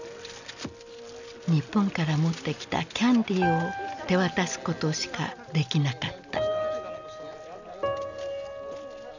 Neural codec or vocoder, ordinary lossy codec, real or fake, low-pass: none; none; real; 7.2 kHz